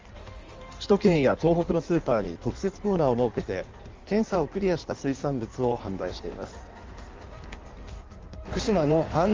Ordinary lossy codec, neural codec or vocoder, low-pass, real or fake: Opus, 32 kbps; codec, 16 kHz in and 24 kHz out, 1.1 kbps, FireRedTTS-2 codec; 7.2 kHz; fake